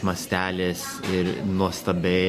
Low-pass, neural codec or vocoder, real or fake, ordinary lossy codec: 14.4 kHz; none; real; MP3, 64 kbps